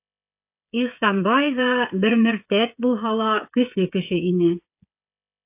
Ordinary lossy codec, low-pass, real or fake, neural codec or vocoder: AAC, 32 kbps; 3.6 kHz; fake; codec, 16 kHz, 16 kbps, FreqCodec, smaller model